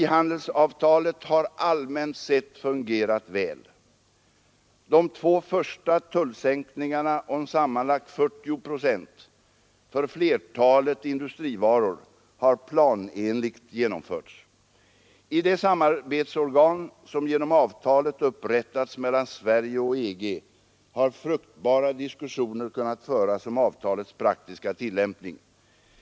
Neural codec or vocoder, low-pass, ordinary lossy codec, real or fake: none; none; none; real